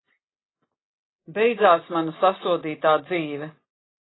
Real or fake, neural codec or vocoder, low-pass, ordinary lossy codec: real; none; 7.2 kHz; AAC, 16 kbps